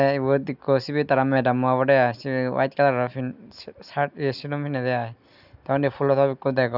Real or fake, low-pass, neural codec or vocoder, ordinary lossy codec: real; 5.4 kHz; none; none